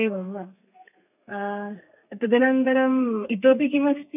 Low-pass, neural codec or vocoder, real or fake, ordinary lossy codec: 3.6 kHz; codec, 32 kHz, 1.9 kbps, SNAC; fake; none